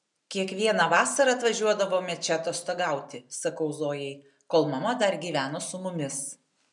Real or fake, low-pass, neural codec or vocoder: real; 10.8 kHz; none